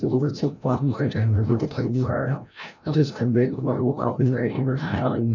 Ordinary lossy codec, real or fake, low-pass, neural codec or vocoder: none; fake; 7.2 kHz; codec, 16 kHz, 0.5 kbps, FreqCodec, larger model